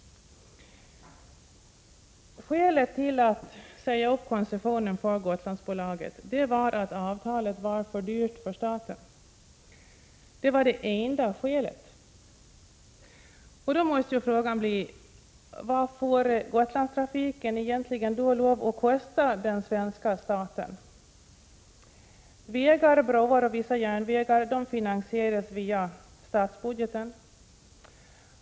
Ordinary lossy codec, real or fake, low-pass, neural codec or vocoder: none; real; none; none